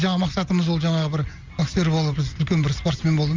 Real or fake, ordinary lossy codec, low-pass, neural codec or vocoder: real; Opus, 32 kbps; 7.2 kHz; none